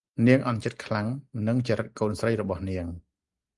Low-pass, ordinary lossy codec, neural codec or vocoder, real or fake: 10.8 kHz; Opus, 32 kbps; none; real